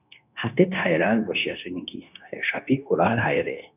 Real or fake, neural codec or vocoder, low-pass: fake; codec, 16 kHz, 0.9 kbps, LongCat-Audio-Codec; 3.6 kHz